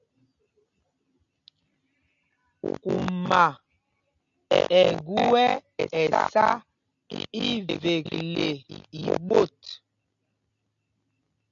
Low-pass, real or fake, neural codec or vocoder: 7.2 kHz; real; none